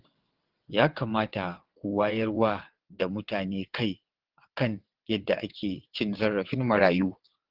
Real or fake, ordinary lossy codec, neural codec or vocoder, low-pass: fake; Opus, 16 kbps; vocoder, 22.05 kHz, 80 mel bands, WaveNeXt; 5.4 kHz